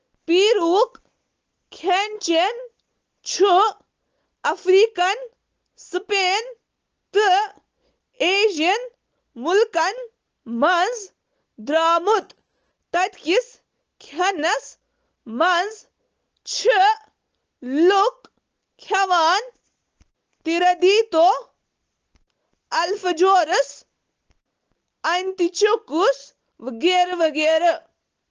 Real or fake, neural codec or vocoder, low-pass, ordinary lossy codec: real; none; 7.2 kHz; Opus, 16 kbps